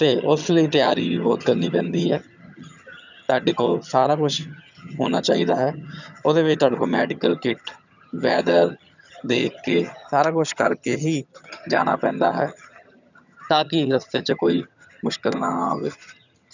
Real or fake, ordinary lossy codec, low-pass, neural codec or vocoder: fake; none; 7.2 kHz; vocoder, 22.05 kHz, 80 mel bands, HiFi-GAN